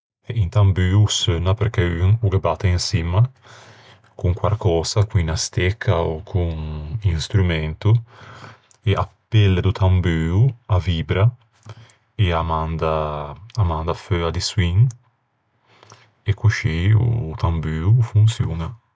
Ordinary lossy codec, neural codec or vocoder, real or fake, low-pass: none; none; real; none